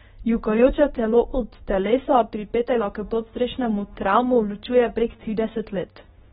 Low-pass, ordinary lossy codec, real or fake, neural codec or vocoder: 10.8 kHz; AAC, 16 kbps; fake; codec, 24 kHz, 0.9 kbps, WavTokenizer, medium speech release version 2